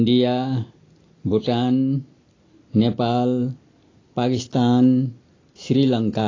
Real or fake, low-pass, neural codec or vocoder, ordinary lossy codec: real; 7.2 kHz; none; AAC, 32 kbps